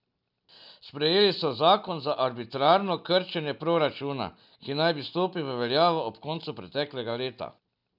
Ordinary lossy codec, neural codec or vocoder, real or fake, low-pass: none; none; real; 5.4 kHz